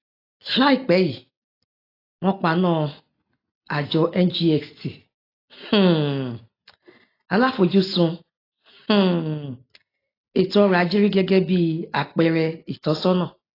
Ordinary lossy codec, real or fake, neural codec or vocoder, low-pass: AAC, 24 kbps; real; none; 5.4 kHz